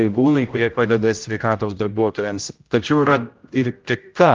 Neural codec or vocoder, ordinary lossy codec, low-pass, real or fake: codec, 16 kHz, 0.5 kbps, X-Codec, HuBERT features, trained on general audio; Opus, 24 kbps; 7.2 kHz; fake